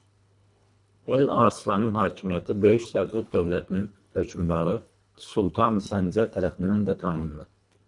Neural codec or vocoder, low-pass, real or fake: codec, 24 kHz, 1.5 kbps, HILCodec; 10.8 kHz; fake